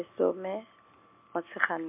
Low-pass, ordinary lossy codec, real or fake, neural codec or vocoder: 3.6 kHz; MP3, 32 kbps; real; none